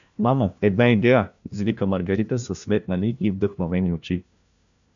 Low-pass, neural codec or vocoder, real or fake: 7.2 kHz; codec, 16 kHz, 1 kbps, FunCodec, trained on LibriTTS, 50 frames a second; fake